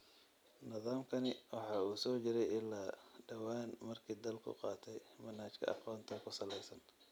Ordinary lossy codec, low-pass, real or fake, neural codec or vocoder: none; none; real; none